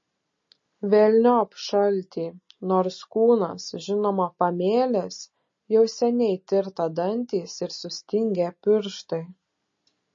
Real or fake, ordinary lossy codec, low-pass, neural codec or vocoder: real; MP3, 32 kbps; 7.2 kHz; none